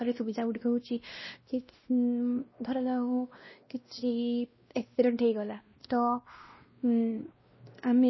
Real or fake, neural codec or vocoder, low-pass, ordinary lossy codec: fake; codec, 16 kHz, 1 kbps, X-Codec, WavLM features, trained on Multilingual LibriSpeech; 7.2 kHz; MP3, 24 kbps